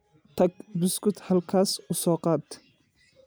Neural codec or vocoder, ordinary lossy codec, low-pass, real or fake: none; none; none; real